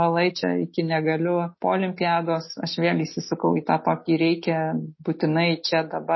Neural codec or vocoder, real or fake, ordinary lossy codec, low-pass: none; real; MP3, 24 kbps; 7.2 kHz